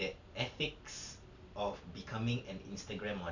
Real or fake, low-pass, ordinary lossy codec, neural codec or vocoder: real; 7.2 kHz; none; none